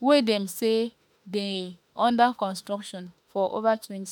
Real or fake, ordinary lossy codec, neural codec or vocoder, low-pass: fake; none; autoencoder, 48 kHz, 32 numbers a frame, DAC-VAE, trained on Japanese speech; none